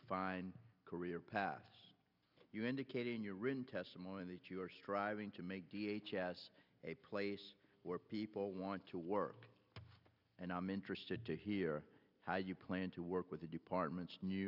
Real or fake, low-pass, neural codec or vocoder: real; 5.4 kHz; none